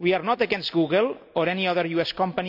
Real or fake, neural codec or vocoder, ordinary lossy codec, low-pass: real; none; none; 5.4 kHz